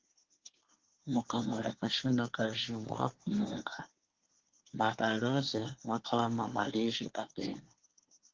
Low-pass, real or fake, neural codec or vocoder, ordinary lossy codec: 7.2 kHz; fake; codec, 24 kHz, 1 kbps, SNAC; Opus, 16 kbps